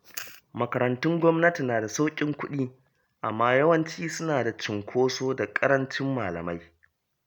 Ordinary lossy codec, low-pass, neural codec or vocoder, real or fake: none; none; none; real